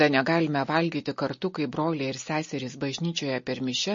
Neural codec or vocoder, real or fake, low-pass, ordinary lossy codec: none; real; 7.2 kHz; MP3, 32 kbps